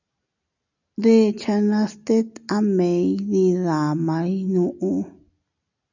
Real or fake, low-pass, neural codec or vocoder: real; 7.2 kHz; none